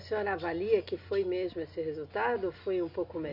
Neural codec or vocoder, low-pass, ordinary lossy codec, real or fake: none; 5.4 kHz; none; real